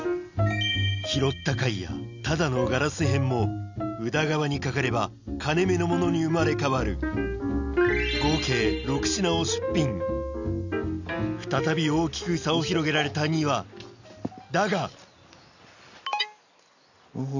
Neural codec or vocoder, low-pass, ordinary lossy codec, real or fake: none; 7.2 kHz; none; real